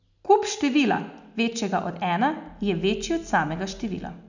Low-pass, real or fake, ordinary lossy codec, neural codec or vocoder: 7.2 kHz; real; none; none